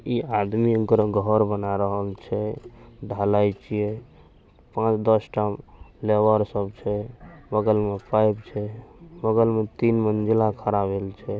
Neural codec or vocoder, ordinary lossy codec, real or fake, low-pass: none; none; real; none